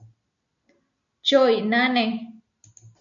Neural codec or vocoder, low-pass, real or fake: none; 7.2 kHz; real